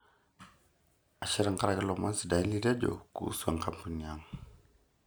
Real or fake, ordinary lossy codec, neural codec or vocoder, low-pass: real; none; none; none